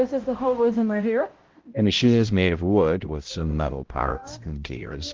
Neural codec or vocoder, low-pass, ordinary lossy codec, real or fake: codec, 16 kHz, 0.5 kbps, X-Codec, HuBERT features, trained on balanced general audio; 7.2 kHz; Opus, 16 kbps; fake